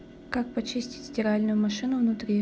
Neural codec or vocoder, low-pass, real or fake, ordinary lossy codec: none; none; real; none